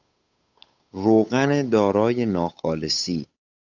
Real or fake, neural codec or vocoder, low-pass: fake; codec, 16 kHz, 8 kbps, FunCodec, trained on Chinese and English, 25 frames a second; 7.2 kHz